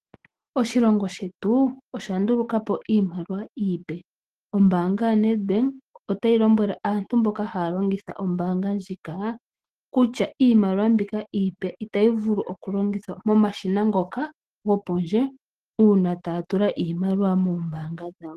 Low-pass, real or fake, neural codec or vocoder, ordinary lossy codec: 14.4 kHz; fake; autoencoder, 48 kHz, 128 numbers a frame, DAC-VAE, trained on Japanese speech; Opus, 16 kbps